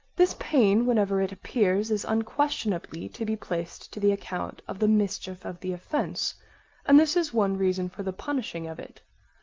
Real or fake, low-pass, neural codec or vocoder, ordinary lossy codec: real; 7.2 kHz; none; Opus, 32 kbps